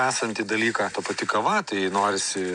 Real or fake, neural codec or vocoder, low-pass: real; none; 9.9 kHz